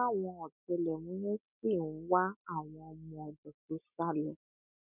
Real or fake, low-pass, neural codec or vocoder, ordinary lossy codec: real; 3.6 kHz; none; none